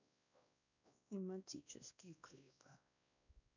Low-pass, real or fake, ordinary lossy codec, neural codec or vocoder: 7.2 kHz; fake; none; codec, 16 kHz, 1 kbps, X-Codec, WavLM features, trained on Multilingual LibriSpeech